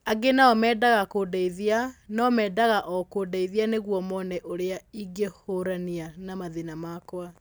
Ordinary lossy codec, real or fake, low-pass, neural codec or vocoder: none; real; none; none